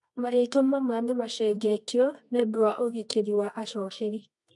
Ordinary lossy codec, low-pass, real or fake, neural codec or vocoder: none; 10.8 kHz; fake; codec, 24 kHz, 0.9 kbps, WavTokenizer, medium music audio release